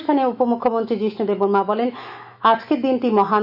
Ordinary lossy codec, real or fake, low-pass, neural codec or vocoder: none; fake; 5.4 kHz; autoencoder, 48 kHz, 128 numbers a frame, DAC-VAE, trained on Japanese speech